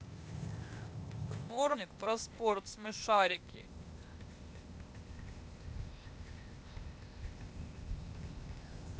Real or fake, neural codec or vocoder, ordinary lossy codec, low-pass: fake; codec, 16 kHz, 0.8 kbps, ZipCodec; none; none